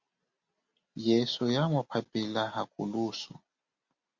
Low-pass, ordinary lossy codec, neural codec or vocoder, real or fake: 7.2 kHz; Opus, 64 kbps; none; real